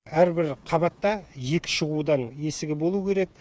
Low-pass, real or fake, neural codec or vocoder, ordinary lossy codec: none; fake; codec, 16 kHz, 8 kbps, FreqCodec, smaller model; none